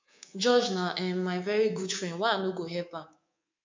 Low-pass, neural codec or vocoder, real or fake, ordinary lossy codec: 7.2 kHz; codec, 24 kHz, 3.1 kbps, DualCodec; fake; MP3, 64 kbps